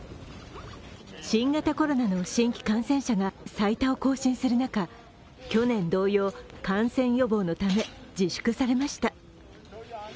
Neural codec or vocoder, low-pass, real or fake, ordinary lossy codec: none; none; real; none